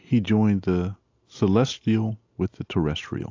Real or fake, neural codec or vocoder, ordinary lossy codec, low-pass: real; none; AAC, 48 kbps; 7.2 kHz